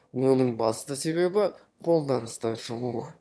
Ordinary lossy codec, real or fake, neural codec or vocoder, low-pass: none; fake; autoencoder, 22.05 kHz, a latent of 192 numbers a frame, VITS, trained on one speaker; none